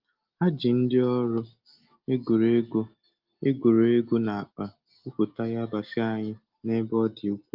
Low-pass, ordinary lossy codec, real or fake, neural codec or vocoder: 5.4 kHz; Opus, 32 kbps; real; none